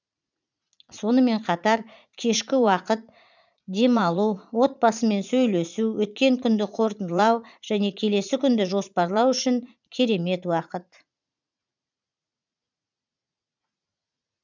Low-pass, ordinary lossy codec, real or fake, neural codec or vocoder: 7.2 kHz; none; fake; vocoder, 44.1 kHz, 80 mel bands, Vocos